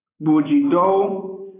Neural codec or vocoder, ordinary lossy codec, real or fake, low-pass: vocoder, 24 kHz, 100 mel bands, Vocos; AAC, 16 kbps; fake; 3.6 kHz